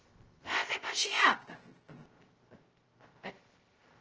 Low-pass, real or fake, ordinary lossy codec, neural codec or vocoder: 7.2 kHz; fake; Opus, 16 kbps; codec, 16 kHz, 0.2 kbps, FocalCodec